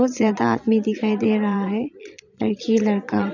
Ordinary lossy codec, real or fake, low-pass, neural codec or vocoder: none; fake; 7.2 kHz; vocoder, 22.05 kHz, 80 mel bands, Vocos